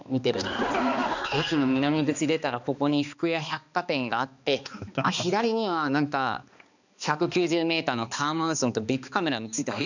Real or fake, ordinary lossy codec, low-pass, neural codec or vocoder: fake; none; 7.2 kHz; codec, 16 kHz, 2 kbps, X-Codec, HuBERT features, trained on balanced general audio